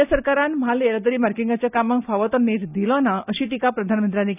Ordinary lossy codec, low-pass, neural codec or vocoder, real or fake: none; 3.6 kHz; none; real